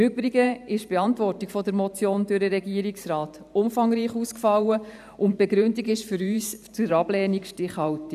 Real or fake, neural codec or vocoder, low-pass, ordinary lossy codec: fake; vocoder, 44.1 kHz, 128 mel bands every 512 samples, BigVGAN v2; 14.4 kHz; none